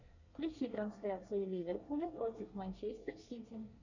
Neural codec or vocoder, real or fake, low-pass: codec, 24 kHz, 1 kbps, SNAC; fake; 7.2 kHz